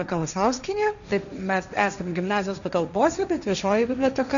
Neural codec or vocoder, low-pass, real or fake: codec, 16 kHz, 1.1 kbps, Voila-Tokenizer; 7.2 kHz; fake